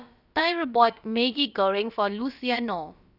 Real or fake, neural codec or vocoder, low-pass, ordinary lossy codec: fake; codec, 16 kHz, about 1 kbps, DyCAST, with the encoder's durations; 5.4 kHz; none